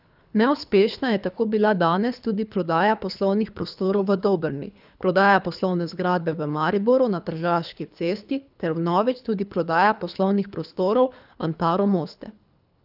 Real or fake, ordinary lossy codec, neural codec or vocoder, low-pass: fake; none; codec, 24 kHz, 3 kbps, HILCodec; 5.4 kHz